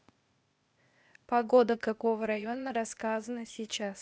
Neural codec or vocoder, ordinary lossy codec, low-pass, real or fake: codec, 16 kHz, 0.8 kbps, ZipCodec; none; none; fake